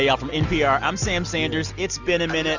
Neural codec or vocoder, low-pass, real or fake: none; 7.2 kHz; real